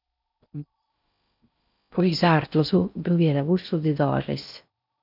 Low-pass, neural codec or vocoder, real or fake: 5.4 kHz; codec, 16 kHz in and 24 kHz out, 0.6 kbps, FocalCodec, streaming, 4096 codes; fake